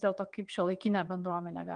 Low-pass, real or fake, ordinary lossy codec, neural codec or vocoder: 9.9 kHz; fake; Opus, 32 kbps; vocoder, 22.05 kHz, 80 mel bands, WaveNeXt